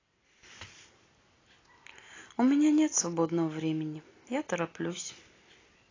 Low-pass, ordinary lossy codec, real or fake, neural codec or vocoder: 7.2 kHz; AAC, 32 kbps; real; none